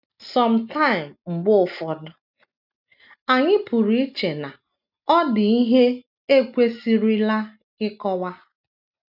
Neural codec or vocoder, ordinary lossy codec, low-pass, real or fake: none; none; 5.4 kHz; real